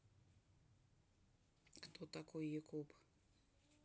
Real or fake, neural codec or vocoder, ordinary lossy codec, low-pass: real; none; none; none